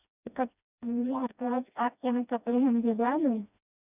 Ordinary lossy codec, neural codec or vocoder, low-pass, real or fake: none; codec, 16 kHz, 1 kbps, FreqCodec, smaller model; 3.6 kHz; fake